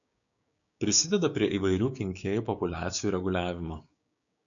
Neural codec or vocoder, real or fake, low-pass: codec, 16 kHz, 6 kbps, DAC; fake; 7.2 kHz